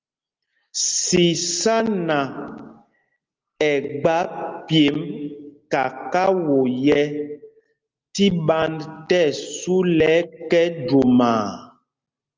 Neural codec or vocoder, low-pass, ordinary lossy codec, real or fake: none; 7.2 kHz; Opus, 32 kbps; real